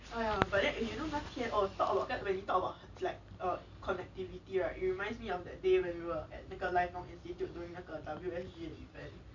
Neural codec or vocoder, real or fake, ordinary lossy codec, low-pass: none; real; none; 7.2 kHz